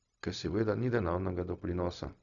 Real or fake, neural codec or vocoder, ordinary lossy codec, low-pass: fake; codec, 16 kHz, 0.4 kbps, LongCat-Audio-Codec; none; 7.2 kHz